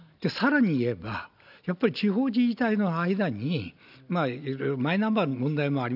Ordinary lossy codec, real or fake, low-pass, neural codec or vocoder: none; real; 5.4 kHz; none